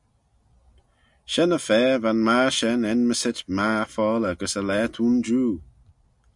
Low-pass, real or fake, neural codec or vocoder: 10.8 kHz; real; none